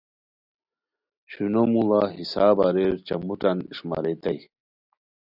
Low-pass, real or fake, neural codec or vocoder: 5.4 kHz; real; none